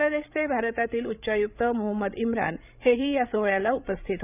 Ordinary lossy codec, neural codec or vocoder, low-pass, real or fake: none; codec, 16 kHz, 16 kbps, FreqCodec, larger model; 3.6 kHz; fake